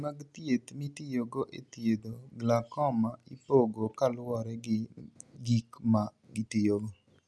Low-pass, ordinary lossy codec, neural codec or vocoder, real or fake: none; none; none; real